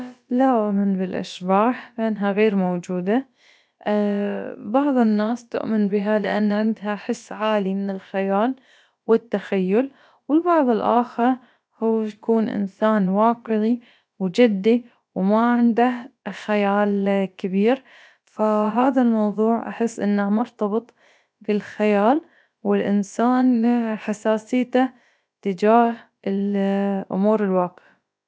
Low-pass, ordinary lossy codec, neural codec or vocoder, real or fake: none; none; codec, 16 kHz, about 1 kbps, DyCAST, with the encoder's durations; fake